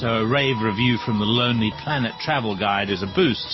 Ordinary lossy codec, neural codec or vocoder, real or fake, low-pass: MP3, 24 kbps; none; real; 7.2 kHz